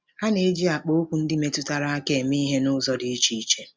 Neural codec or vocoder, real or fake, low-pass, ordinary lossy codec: none; real; none; none